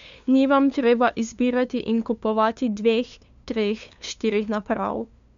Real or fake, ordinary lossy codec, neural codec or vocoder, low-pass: fake; MP3, 64 kbps; codec, 16 kHz, 2 kbps, FunCodec, trained on LibriTTS, 25 frames a second; 7.2 kHz